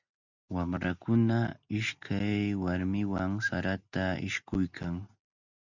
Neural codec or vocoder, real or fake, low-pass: none; real; 7.2 kHz